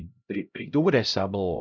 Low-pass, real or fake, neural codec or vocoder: 7.2 kHz; fake; codec, 16 kHz, 0.5 kbps, X-Codec, HuBERT features, trained on LibriSpeech